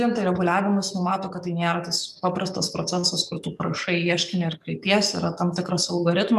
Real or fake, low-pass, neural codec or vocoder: fake; 14.4 kHz; codec, 44.1 kHz, 7.8 kbps, DAC